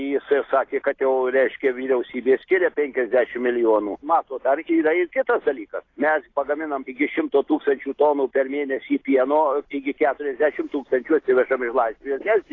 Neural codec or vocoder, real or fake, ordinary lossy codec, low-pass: none; real; AAC, 32 kbps; 7.2 kHz